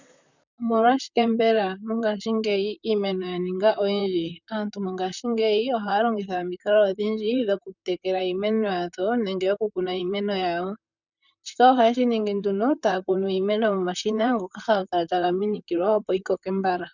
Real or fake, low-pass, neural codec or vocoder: fake; 7.2 kHz; vocoder, 44.1 kHz, 128 mel bands, Pupu-Vocoder